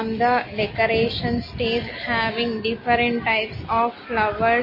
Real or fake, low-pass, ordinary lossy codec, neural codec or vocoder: real; 5.4 kHz; MP3, 24 kbps; none